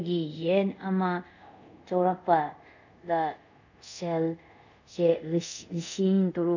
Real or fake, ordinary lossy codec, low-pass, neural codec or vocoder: fake; none; 7.2 kHz; codec, 24 kHz, 0.5 kbps, DualCodec